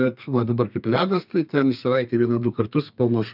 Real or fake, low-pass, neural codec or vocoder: fake; 5.4 kHz; codec, 32 kHz, 1.9 kbps, SNAC